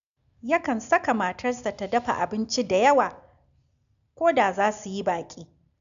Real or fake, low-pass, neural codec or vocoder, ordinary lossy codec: real; 7.2 kHz; none; none